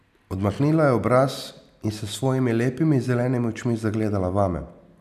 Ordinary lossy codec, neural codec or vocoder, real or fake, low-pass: none; none; real; 14.4 kHz